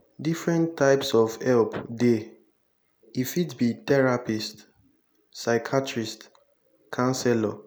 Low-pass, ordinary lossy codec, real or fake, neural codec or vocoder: none; none; real; none